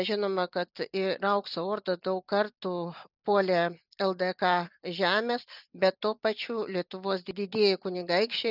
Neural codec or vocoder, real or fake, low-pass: none; real; 5.4 kHz